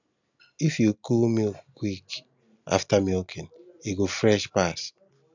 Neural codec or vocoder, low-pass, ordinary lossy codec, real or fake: none; 7.2 kHz; none; real